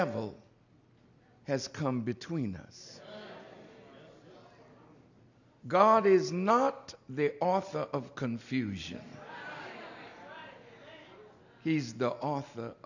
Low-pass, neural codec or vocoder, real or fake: 7.2 kHz; none; real